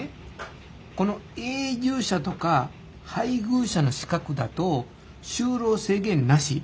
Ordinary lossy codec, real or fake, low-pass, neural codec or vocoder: none; real; none; none